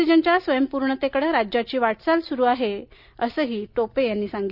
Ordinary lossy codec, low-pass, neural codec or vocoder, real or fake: none; 5.4 kHz; none; real